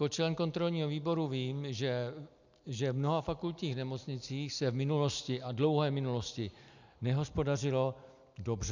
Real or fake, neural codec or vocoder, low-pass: real; none; 7.2 kHz